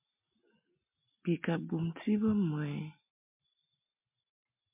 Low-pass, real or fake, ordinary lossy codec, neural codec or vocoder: 3.6 kHz; real; MP3, 32 kbps; none